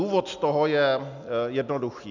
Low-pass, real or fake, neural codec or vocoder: 7.2 kHz; real; none